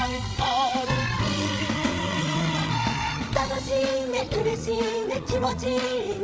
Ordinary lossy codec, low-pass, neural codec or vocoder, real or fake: none; none; codec, 16 kHz, 8 kbps, FreqCodec, larger model; fake